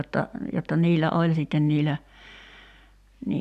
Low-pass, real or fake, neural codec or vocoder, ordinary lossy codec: 14.4 kHz; real; none; none